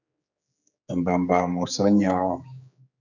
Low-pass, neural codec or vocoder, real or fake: 7.2 kHz; codec, 16 kHz, 4 kbps, X-Codec, HuBERT features, trained on general audio; fake